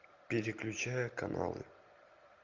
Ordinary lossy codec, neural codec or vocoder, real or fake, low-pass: Opus, 24 kbps; none; real; 7.2 kHz